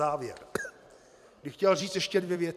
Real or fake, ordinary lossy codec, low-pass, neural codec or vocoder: fake; MP3, 96 kbps; 14.4 kHz; vocoder, 44.1 kHz, 128 mel bands every 256 samples, BigVGAN v2